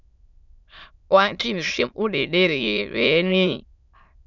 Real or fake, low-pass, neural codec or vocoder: fake; 7.2 kHz; autoencoder, 22.05 kHz, a latent of 192 numbers a frame, VITS, trained on many speakers